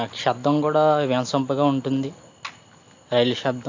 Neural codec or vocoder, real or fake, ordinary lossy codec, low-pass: none; real; AAC, 48 kbps; 7.2 kHz